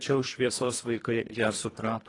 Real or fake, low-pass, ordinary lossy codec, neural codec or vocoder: fake; 10.8 kHz; AAC, 32 kbps; codec, 24 kHz, 1.5 kbps, HILCodec